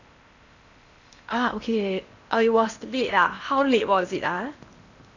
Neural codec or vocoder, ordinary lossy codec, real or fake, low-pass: codec, 16 kHz in and 24 kHz out, 0.8 kbps, FocalCodec, streaming, 65536 codes; none; fake; 7.2 kHz